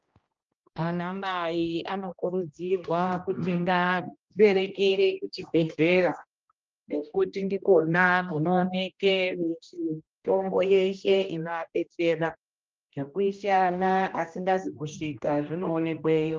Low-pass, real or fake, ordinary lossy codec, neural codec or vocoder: 7.2 kHz; fake; Opus, 24 kbps; codec, 16 kHz, 1 kbps, X-Codec, HuBERT features, trained on general audio